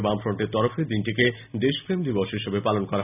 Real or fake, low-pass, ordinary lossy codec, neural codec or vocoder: real; 3.6 kHz; none; none